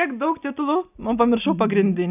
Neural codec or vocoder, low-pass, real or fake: none; 3.6 kHz; real